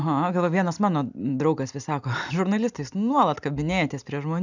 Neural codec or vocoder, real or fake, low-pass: none; real; 7.2 kHz